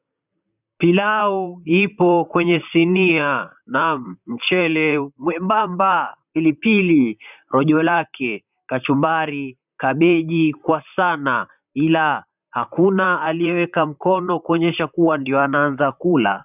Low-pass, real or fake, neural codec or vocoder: 3.6 kHz; fake; vocoder, 44.1 kHz, 128 mel bands, Pupu-Vocoder